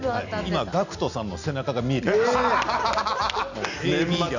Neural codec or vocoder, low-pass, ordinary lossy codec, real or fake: none; 7.2 kHz; none; real